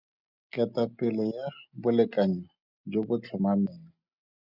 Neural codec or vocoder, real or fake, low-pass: none; real; 5.4 kHz